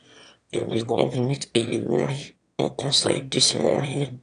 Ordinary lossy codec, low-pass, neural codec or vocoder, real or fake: none; 9.9 kHz; autoencoder, 22.05 kHz, a latent of 192 numbers a frame, VITS, trained on one speaker; fake